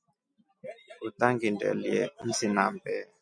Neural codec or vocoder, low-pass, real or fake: none; 9.9 kHz; real